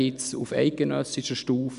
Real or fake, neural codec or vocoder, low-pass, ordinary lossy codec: real; none; 10.8 kHz; none